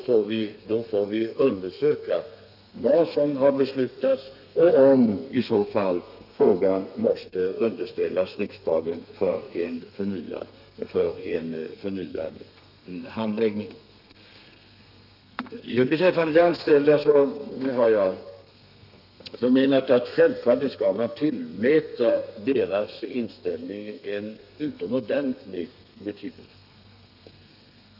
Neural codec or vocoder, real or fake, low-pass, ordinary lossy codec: codec, 32 kHz, 1.9 kbps, SNAC; fake; 5.4 kHz; none